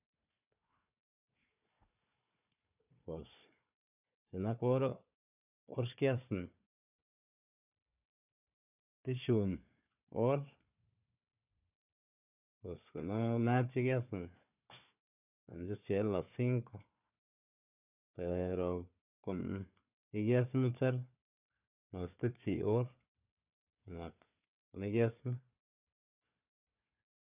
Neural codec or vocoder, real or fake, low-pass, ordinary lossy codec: codec, 16 kHz, 4 kbps, FunCodec, trained on Chinese and English, 50 frames a second; fake; 3.6 kHz; none